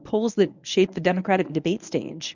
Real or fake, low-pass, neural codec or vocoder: fake; 7.2 kHz; codec, 24 kHz, 0.9 kbps, WavTokenizer, medium speech release version 1